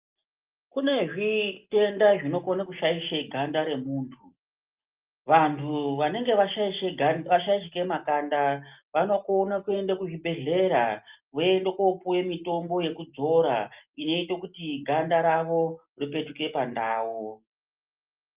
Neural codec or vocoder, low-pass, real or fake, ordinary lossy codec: none; 3.6 kHz; real; Opus, 24 kbps